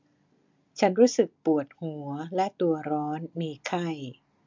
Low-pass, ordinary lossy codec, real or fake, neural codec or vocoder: 7.2 kHz; MP3, 64 kbps; real; none